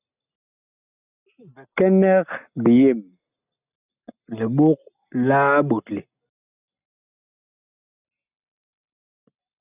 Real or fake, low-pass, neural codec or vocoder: real; 3.6 kHz; none